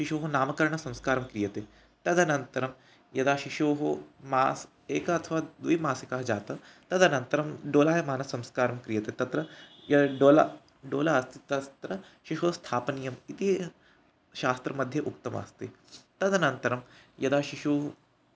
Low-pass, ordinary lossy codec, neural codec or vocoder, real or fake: none; none; none; real